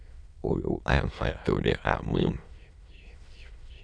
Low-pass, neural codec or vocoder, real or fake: 9.9 kHz; autoencoder, 22.05 kHz, a latent of 192 numbers a frame, VITS, trained on many speakers; fake